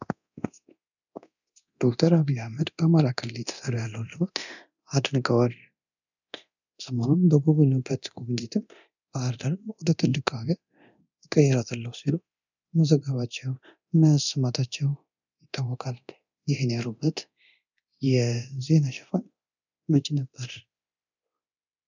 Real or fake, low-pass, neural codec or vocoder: fake; 7.2 kHz; codec, 24 kHz, 0.9 kbps, DualCodec